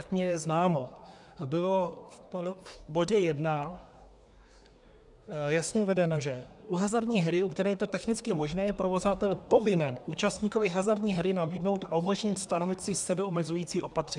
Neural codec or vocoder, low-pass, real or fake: codec, 24 kHz, 1 kbps, SNAC; 10.8 kHz; fake